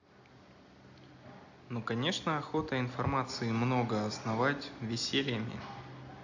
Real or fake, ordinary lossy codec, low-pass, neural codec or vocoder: real; AAC, 48 kbps; 7.2 kHz; none